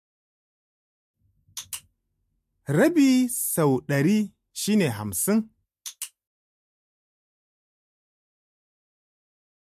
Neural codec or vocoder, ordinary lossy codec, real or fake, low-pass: none; MP3, 64 kbps; real; 14.4 kHz